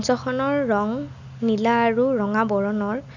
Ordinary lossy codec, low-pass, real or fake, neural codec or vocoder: none; 7.2 kHz; real; none